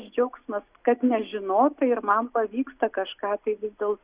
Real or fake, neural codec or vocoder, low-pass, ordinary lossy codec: real; none; 3.6 kHz; Opus, 64 kbps